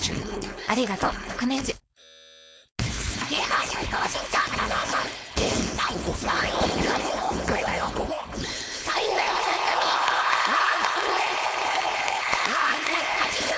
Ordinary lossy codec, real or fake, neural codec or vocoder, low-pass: none; fake; codec, 16 kHz, 4.8 kbps, FACodec; none